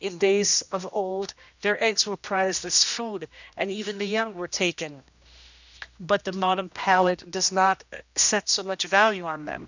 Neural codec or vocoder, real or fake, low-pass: codec, 16 kHz, 1 kbps, X-Codec, HuBERT features, trained on general audio; fake; 7.2 kHz